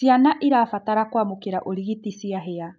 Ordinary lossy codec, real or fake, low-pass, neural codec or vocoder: none; real; none; none